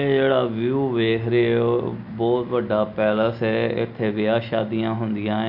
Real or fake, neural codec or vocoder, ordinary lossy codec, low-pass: real; none; none; 5.4 kHz